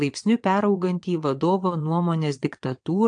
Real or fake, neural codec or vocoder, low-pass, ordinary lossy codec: fake; vocoder, 22.05 kHz, 80 mel bands, WaveNeXt; 9.9 kHz; AAC, 64 kbps